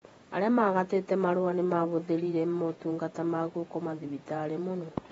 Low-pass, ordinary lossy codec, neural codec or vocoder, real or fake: 19.8 kHz; AAC, 24 kbps; vocoder, 48 kHz, 128 mel bands, Vocos; fake